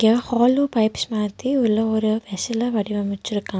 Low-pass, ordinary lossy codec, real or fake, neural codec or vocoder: none; none; real; none